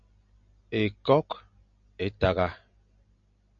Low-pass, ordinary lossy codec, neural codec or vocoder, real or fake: 7.2 kHz; MP3, 48 kbps; none; real